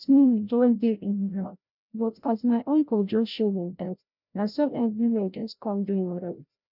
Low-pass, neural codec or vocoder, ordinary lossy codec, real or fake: 5.4 kHz; codec, 16 kHz, 0.5 kbps, FreqCodec, larger model; none; fake